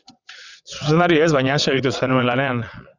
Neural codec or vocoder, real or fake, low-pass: vocoder, 22.05 kHz, 80 mel bands, WaveNeXt; fake; 7.2 kHz